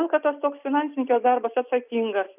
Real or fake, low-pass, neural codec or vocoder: real; 3.6 kHz; none